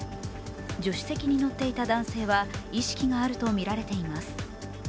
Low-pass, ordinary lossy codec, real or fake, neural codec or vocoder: none; none; real; none